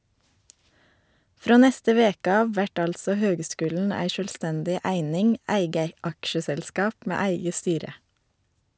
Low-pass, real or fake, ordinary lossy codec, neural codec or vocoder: none; real; none; none